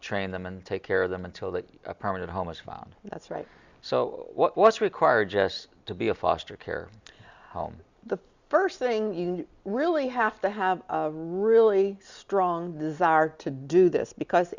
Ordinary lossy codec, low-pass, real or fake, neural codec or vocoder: Opus, 64 kbps; 7.2 kHz; real; none